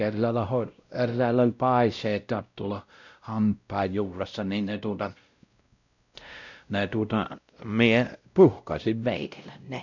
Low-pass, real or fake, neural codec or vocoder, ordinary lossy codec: 7.2 kHz; fake; codec, 16 kHz, 0.5 kbps, X-Codec, WavLM features, trained on Multilingual LibriSpeech; none